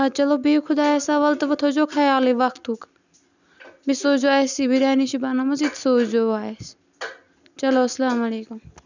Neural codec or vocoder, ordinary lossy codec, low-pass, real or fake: none; none; 7.2 kHz; real